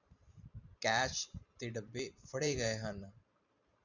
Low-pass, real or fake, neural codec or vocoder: 7.2 kHz; fake; vocoder, 44.1 kHz, 128 mel bands every 512 samples, BigVGAN v2